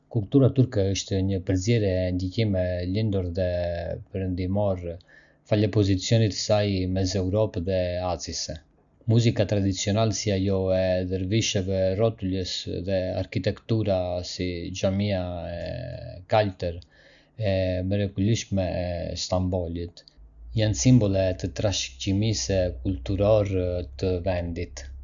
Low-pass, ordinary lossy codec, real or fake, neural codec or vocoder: 7.2 kHz; none; real; none